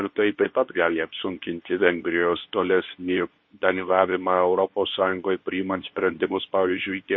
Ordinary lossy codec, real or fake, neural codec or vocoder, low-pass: MP3, 32 kbps; fake; codec, 24 kHz, 0.9 kbps, WavTokenizer, medium speech release version 2; 7.2 kHz